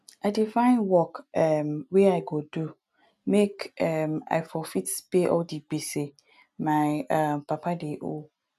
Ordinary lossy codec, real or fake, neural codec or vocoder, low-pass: none; real; none; 14.4 kHz